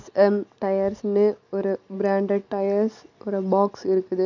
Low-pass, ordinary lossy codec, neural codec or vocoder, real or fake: 7.2 kHz; none; none; real